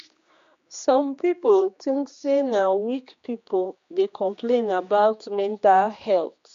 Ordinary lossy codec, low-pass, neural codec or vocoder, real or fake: MP3, 48 kbps; 7.2 kHz; codec, 16 kHz, 2 kbps, X-Codec, HuBERT features, trained on general audio; fake